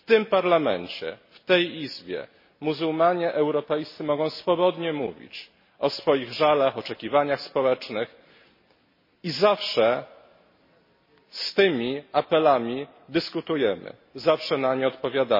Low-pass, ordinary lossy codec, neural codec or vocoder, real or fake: 5.4 kHz; MP3, 24 kbps; none; real